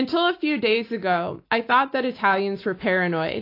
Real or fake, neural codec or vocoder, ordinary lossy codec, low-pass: real; none; AAC, 32 kbps; 5.4 kHz